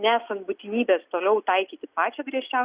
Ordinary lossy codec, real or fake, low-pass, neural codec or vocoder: Opus, 64 kbps; real; 3.6 kHz; none